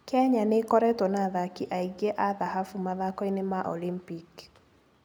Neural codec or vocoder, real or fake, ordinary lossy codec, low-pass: none; real; none; none